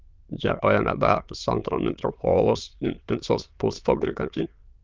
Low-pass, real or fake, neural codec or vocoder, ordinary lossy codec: 7.2 kHz; fake; autoencoder, 22.05 kHz, a latent of 192 numbers a frame, VITS, trained on many speakers; Opus, 24 kbps